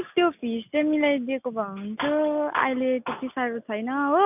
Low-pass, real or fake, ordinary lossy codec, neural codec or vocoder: 3.6 kHz; real; none; none